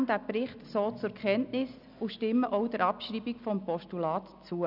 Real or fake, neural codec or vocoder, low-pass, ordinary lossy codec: real; none; 5.4 kHz; none